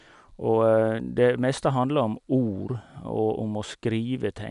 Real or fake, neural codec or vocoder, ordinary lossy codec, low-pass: real; none; none; 10.8 kHz